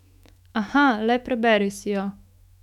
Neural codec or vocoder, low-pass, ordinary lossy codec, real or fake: autoencoder, 48 kHz, 128 numbers a frame, DAC-VAE, trained on Japanese speech; 19.8 kHz; none; fake